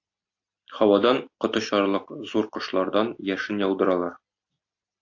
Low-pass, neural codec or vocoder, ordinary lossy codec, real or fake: 7.2 kHz; none; AAC, 48 kbps; real